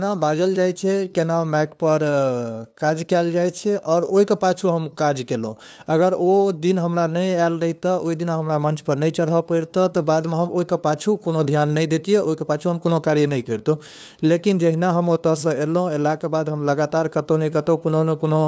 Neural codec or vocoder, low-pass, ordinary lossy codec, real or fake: codec, 16 kHz, 2 kbps, FunCodec, trained on LibriTTS, 25 frames a second; none; none; fake